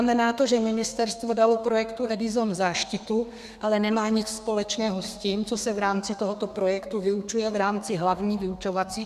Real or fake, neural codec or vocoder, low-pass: fake; codec, 32 kHz, 1.9 kbps, SNAC; 14.4 kHz